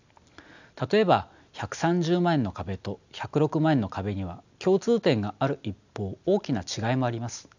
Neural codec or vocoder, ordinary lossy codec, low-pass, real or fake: none; none; 7.2 kHz; real